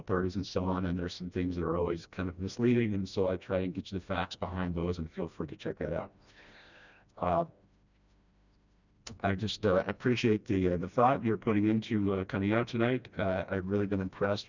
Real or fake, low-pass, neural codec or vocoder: fake; 7.2 kHz; codec, 16 kHz, 1 kbps, FreqCodec, smaller model